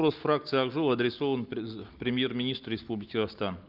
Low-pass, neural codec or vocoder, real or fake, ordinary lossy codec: 5.4 kHz; none; real; Opus, 24 kbps